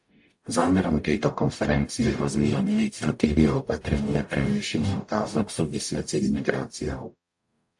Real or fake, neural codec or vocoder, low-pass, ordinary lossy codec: fake; codec, 44.1 kHz, 0.9 kbps, DAC; 10.8 kHz; AAC, 64 kbps